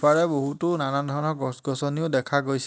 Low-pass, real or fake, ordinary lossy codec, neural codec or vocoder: none; real; none; none